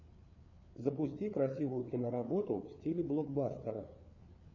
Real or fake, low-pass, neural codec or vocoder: fake; 7.2 kHz; codec, 16 kHz, 4 kbps, FreqCodec, larger model